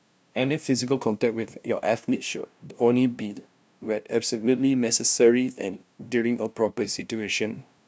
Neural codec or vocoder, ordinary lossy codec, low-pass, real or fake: codec, 16 kHz, 0.5 kbps, FunCodec, trained on LibriTTS, 25 frames a second; none; none; fake